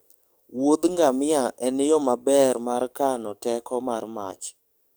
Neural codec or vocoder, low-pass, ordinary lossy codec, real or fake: codec, 44.1 kHz, 7.8 kbps, DAC; none; none; fake